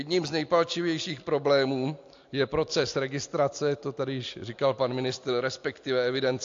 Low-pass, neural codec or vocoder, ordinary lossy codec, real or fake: 7.2 kHz; none; AAC, 48 kbps; real